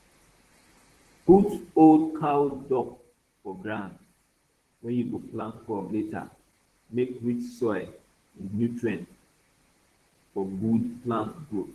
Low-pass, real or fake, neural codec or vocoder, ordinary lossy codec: 14.4 kHz; fake; vocoder, 44.1 kHz, 128 mel bands, Pupu-Vocoder; Opus, 16 kbps